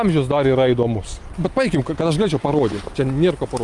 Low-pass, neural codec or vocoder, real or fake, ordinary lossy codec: 10.8 kHz; none; real; Opus, 32 kbps